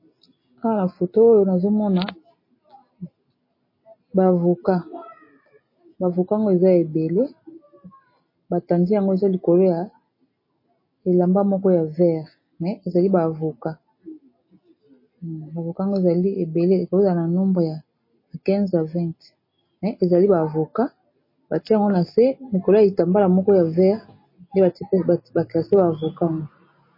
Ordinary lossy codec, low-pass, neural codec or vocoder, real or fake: MP3, 24 kbps; 5.4 kHz; none; real